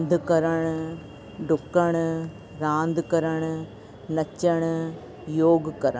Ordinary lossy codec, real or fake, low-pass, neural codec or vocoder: none; real; none; none